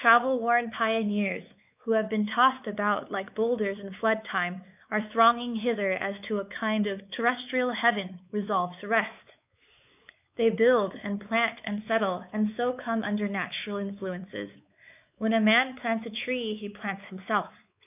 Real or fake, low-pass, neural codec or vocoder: fake; 3.6 kHz; codec, 16 kHz, 4 kbps, X-Codec, WavLM features, trained on Multilingual LibriSpeech